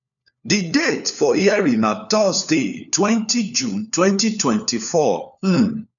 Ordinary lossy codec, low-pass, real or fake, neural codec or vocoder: none; 7.2 kHz; fake; codec, 16 kHz, 4 kbps, FunCodec, trained on LibriTTS, 50 frames a second